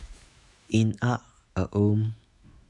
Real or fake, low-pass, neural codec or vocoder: fake; 10.8 kHz; autoencoder, 48 kHz, 128 numbers a frame, DAC-VAE, trained on Japanese speech